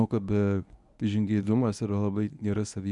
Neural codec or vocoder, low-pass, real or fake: codec, 24 kHz, 0.9 kbps, WavTokenizer, medium speech release version 1; 10.8 kHz; fake